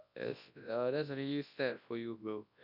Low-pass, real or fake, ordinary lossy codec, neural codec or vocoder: 5.4 kHz; fake; none; codec, 24 kHz, 0.9 kbps, WavTokenizer, large speech release